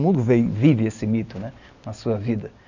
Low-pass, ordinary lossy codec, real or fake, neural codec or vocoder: 7.2 kHz; none; real; none